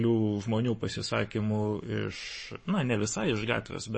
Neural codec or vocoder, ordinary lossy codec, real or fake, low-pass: codec, 44.1 kHz, 7.8 kbps, Pupu-Codec; MP3, 32 kbps; fake; 9.9 kHz